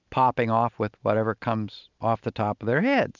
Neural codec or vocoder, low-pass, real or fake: none; 7.2 kHz; real